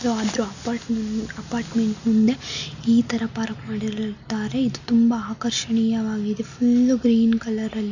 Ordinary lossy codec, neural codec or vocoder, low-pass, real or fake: none; none; 7.2 kHz; real